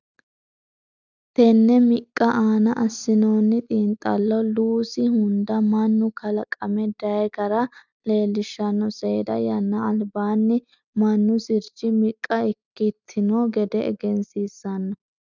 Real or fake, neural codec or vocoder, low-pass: real; none; 7.2 kHz